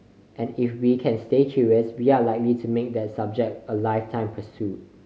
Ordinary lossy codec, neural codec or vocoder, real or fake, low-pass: none; none; real; none